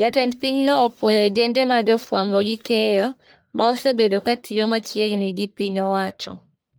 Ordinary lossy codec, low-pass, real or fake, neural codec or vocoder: none; none; fake; codec, 44.1 kHz, 1.7 kbps, Pupu-Codec